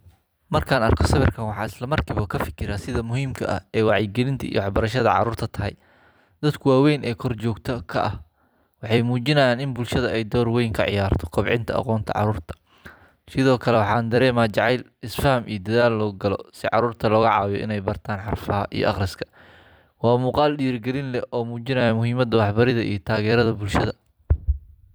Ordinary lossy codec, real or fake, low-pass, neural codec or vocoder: none; fake; none; vocoder, 44.1 kHz, 128 mel bands every 256 samples, BigVGAN v2